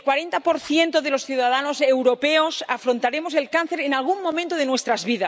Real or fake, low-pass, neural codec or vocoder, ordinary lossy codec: real; none; none; none